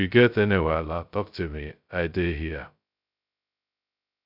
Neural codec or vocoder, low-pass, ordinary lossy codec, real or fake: codec, 16 kHz, 0.2 kbps, FocalCodec; 5.4 kHz; none; fake